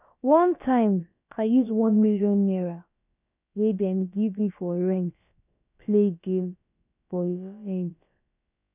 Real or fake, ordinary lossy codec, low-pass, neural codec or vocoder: fake; none; 3.6 kHz; codec, 16 kHz, about 1 kbps, DyCAST, with the encoder's durations